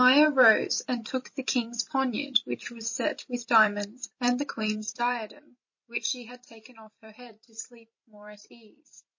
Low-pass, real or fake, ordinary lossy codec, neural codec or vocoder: 7.2 kHz; fake; MP3, 32 kbps; vocoder, 44.1 kHz, 128 mel bands every 256 samples, BigVGAN v2